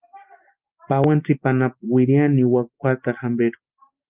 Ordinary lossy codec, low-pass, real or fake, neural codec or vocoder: Opus, 32 kbps; 3.6 kHz; real; none